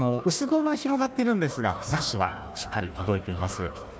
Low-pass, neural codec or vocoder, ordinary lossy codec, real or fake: none; codec, 16 kHz, 1 kbps, FunCodec, trained on Chinese and English, 50 frames a second; none; fake